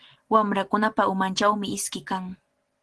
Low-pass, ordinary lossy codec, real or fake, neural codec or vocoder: 10.8 kHz; Opus, 16 kbps; real; none